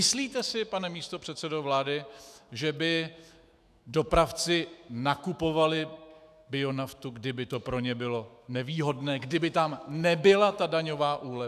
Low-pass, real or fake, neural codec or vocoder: 14.4 kHz; fake; autoencoder, 48 kHz, 128 numbers a frame, DAC-VAE, trained on Japanese speech